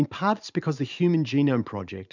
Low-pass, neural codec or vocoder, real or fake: 7.2 kHz; vocoder, 44.1 kHz, 128 mel bands every 512 samples, BigVGAN v2; fake